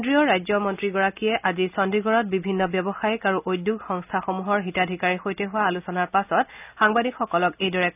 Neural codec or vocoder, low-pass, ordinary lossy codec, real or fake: none; 3.6 kHz; none; real